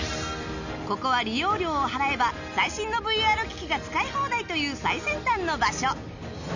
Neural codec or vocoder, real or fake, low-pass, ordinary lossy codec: none; real; 7.2 kHz; none